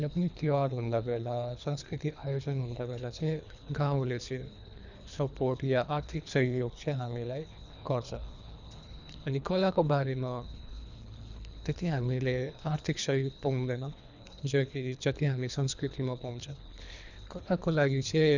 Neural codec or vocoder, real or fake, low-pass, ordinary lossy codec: codec, 24 kHz, 3 kbps, HILCodec; fake; 7.2 kHz; none